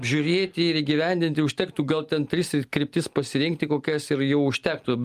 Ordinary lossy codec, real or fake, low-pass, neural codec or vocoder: Opus, 32 kbps; real; 14.4 kHz; none